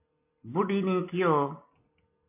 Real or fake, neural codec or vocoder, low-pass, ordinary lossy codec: real; none; 3.6 kHz; MP3, 24 kbps